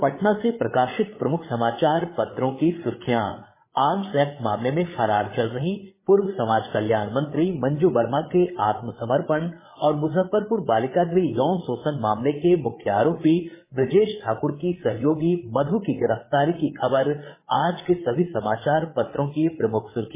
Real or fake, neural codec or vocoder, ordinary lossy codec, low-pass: fake; codec, 16 kHz, 8 kbps, FreqCodec, larger model; MP3, 16 kbps; 3.6 kHz